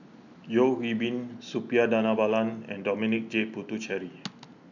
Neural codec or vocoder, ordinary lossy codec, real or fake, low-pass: none; none; real; 7.2 kHz